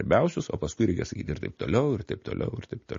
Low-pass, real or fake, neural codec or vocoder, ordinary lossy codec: 7.2 kHz; real; none; MP3, 32 kbps